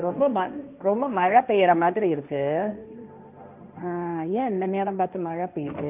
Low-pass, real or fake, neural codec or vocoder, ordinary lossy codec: 3.6 kHz; fake; codec, 16 kHz, 1.1 kbps, Voila-Tokenizer; none